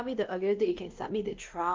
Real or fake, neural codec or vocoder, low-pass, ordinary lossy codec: fake; codec, 16 kHz, 1 kbps, X-Codec, WavLM features, trained on Multilingual LibriSpeech; 7.2 kHz; Opus, 32 kbps